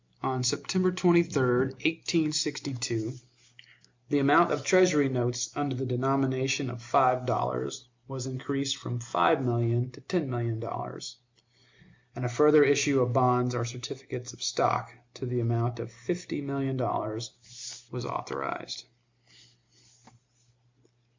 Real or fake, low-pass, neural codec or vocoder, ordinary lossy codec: real; 7.2 kHz; none; MP3, 64 kbps